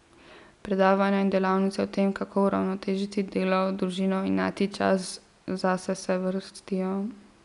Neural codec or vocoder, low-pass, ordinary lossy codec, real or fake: none; 10.8 kHz; none; real